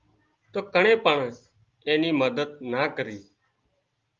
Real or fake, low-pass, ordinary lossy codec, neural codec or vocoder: real; 7.2 kHz; Opus, 32 kbps; none